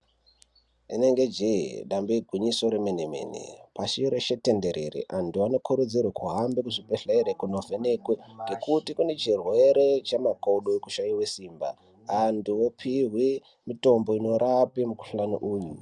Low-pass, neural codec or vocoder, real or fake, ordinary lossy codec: 10.8 kHz; none; real; Opus, 64 kbps